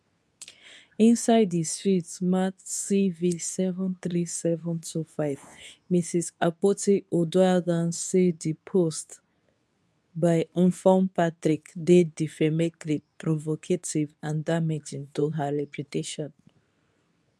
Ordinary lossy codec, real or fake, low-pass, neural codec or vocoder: none; fake; none; codec, 24 kHz, 0.9 kbps, WavTokenizer, medium speech release version 2